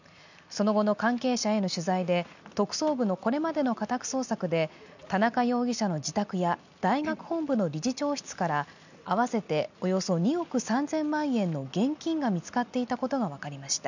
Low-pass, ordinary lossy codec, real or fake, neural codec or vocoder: 7.2 kHz; none; real; none